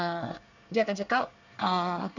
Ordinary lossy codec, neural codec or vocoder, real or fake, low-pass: none; codec, 24 kHz, 1 kbps, SNAC; fake; 7.2 kHz